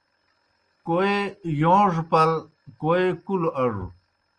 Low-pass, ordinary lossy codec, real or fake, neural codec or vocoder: 9.9 kHz; Opus, 32 kbps; real; none